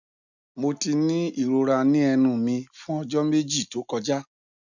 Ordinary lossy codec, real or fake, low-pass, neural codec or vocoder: none; real; 7.2 kHz; none